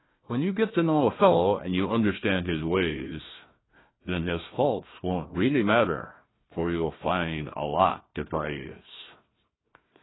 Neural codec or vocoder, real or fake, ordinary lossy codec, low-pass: codec, 16 kHz, 1 kbps, FunCodec, trained on Chinese and English, 50 frames a second; fake; AAC, 16 kbps; 7.2 kHz